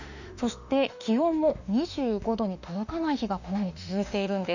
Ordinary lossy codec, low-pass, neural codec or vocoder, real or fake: none; 7.2 kHz; autoencoder, 48 kHz, 32 numbers a frame, DAC-VAE, trained on Japanese speech; fake